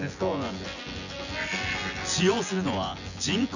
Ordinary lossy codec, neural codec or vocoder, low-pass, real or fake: none; vocoder, 24 kHz, 100 mel bands, Vocos; 7.2 kHz; fake